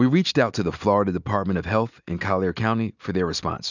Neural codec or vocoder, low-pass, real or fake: none; 7.2 kHz; real